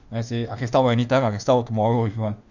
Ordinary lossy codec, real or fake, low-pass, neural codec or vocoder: none; fake; 7.2 kHz; autoencoder, 48 kHz, 32 numbers a frame, DAC-VAE, trained on Japanese speech